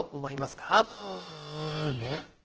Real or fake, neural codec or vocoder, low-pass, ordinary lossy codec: fake; codec, 16 kHz, about 1 kbps, DyCAST, with the encoder's durations; 7.2 kHz; Opus, 16 kbps